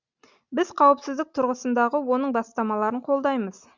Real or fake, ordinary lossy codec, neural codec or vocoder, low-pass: real; Opus, 64 kbps; none; 7.2 kHz